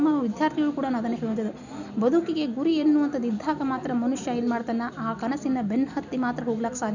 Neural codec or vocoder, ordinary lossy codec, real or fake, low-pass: none; none; real; 7.2 kHz